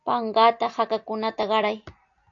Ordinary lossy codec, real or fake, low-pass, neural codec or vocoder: AAC, 48 kbps; real; 7.2 kHz; none